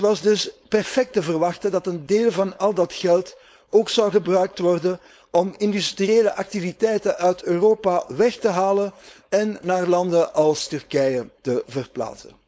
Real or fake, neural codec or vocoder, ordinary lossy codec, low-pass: fake; codec, 16 kHz, 4.8 kbps, FACodec; none; none